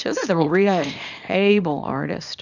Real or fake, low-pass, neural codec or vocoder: fake; 7.2 kHz; codec, 24 kHz, 0.9 kbps, WavTokenizer, small release